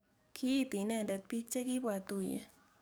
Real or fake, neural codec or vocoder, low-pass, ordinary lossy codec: fake; codec, 44.1 kHz, 7.8 kbps, DAC; none; none